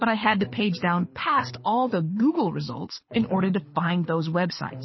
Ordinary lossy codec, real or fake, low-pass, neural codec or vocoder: MP3, 24 kbps; fake; 7.2 kHz; codec, 24 kHz, 6 kbps, HILCodec